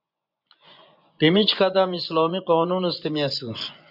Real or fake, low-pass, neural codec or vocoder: fake; 5.4 kHz; vocoder, 24 kHz, 100 mel bands, Vocos